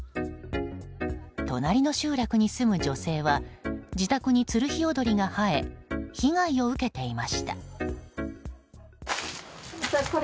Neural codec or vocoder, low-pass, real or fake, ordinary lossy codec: none; none; real; none